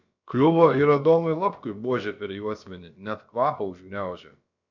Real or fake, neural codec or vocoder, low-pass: fake; codec, 16 kHz, about 1 kbps, DyCAST, with the encoder's durations; 7.2 kHz